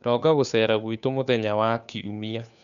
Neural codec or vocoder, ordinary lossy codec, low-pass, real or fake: codec, 16 kHz, 2 kbps, FunCodec, trained on Chinese and English, 25 frames a second; none; 7.2 kHz; fake